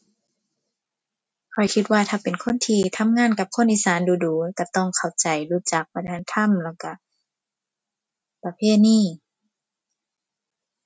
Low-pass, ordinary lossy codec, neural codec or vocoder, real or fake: none; none; none; real